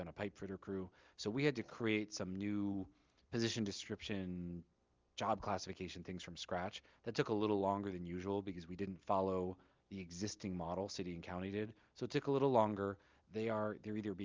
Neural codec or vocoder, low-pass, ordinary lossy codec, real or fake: none; 7.2 kHz; Opus, 24 kbps; real